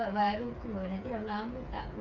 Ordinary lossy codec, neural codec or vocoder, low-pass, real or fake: none; codec, 16 kHz, 8 kbps, FreqCodec, smaller model; 7.2 kHz; fake